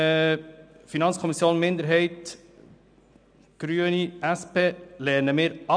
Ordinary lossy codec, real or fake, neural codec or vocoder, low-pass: none; real; none; 9.9 kHz